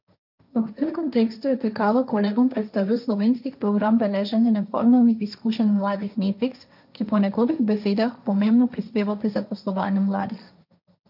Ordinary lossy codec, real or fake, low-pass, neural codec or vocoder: none; fake; 5.4 kHz; codec, 16 kHz, 1.1 kbps, Voila-Tokenizer